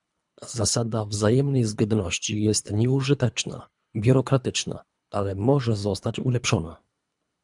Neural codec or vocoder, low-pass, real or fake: codec, 24 kHz, 3 kbps, HILCodec; 10.8 kHz; fake